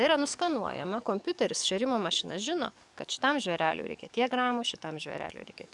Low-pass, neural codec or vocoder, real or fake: 10.8 kHz; codec, 44.1 kHz, 7.8 kbps, DAC; fake